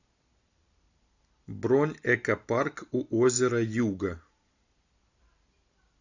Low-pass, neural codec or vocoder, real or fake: 7.2 kHz; none; real